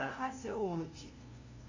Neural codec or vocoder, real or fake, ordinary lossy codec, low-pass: codec, 16 kHz, 0.5 kbps, FunCodec, trained on LibriTTS, 25 frames a second; fake; AAC, 48 kbps; 7.2 kHz